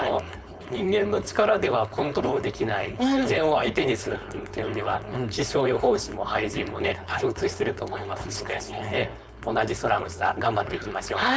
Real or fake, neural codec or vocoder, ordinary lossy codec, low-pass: fake; codec, 16 kHz, 4.8 kbps, FACodec; none; none